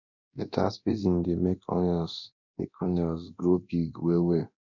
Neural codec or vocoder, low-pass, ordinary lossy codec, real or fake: codec, 24 kHz, 0.9 kbps, DualCodec; 7.2 kHz; none; fake